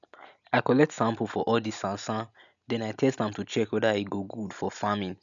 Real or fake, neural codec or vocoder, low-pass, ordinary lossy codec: real; none; 7.2 kHz; none